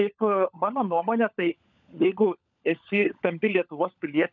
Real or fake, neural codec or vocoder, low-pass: fake; codec, 16 kHz, 16 kbps, FunCodec, trained on LibriTTS, 50 frames a second; 7.2 kHz